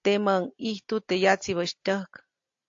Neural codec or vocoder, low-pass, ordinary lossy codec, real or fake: none; 7.2 kHz; AAC, 48 kbps; real